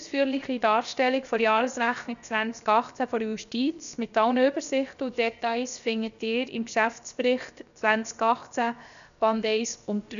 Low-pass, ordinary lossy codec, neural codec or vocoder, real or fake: 7.2 kHz; none; codec, 16 kHz, 0.7 kbps, FocalCodec; fake